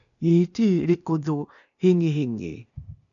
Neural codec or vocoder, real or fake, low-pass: codec, 16 kHz, 0.8 kbps, ZipCodec; fake; 7.2 kHz